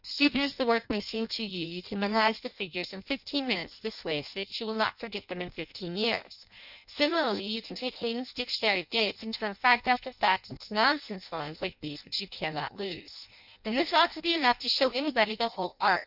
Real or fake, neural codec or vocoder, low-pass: fake; codec, 16 kHz in and 24 kHz out, 0.6 kbps, FireRedTTS-2 codec; 5.4 kHz